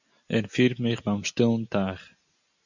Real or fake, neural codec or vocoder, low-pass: real; none; 7.2 kHz